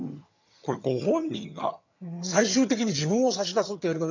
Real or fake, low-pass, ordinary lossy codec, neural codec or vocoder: fake; 7.2 kHz; none; vocoder, 22.05 kHz, 80 mel bands, HiFi-GAN